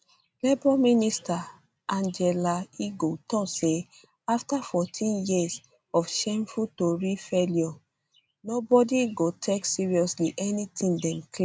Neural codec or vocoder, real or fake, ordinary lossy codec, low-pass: none; real; none; none